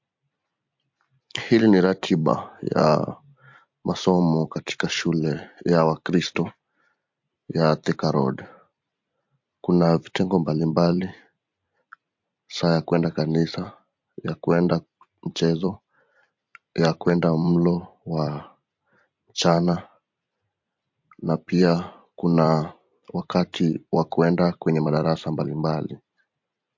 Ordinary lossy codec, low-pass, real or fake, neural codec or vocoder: MP3, 48 kbps; 7.2 kHz; fake; vocoder, 44.1 kHz, 128 mel bands every 256 samples, BigVGAN v2